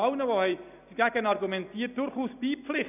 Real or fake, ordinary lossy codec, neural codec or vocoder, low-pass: real; none; none; 3.6 kHz